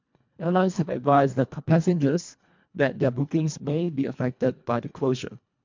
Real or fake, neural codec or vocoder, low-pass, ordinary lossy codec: fake; codec, 24 kHz, 1.5 kbps, HILCodec; 7.2 kHz; MP3, 64 kbps